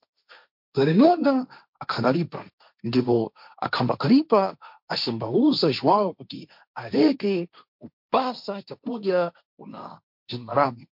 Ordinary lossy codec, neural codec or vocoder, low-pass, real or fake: MP3, 48 kbps; codec, 16 kHz, 1.1 kbps, Voila-Tokenizer; 5.4 kHz; fake